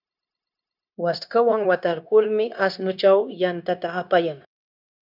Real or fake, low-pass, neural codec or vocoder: fake; 5.4 kHz; codec, 16 kHz, 0.9 kbps, LongCat-Audio-Codec